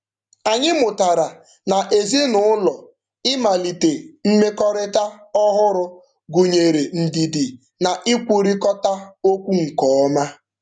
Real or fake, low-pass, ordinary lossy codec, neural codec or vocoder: real; 9.9 kHz; none; none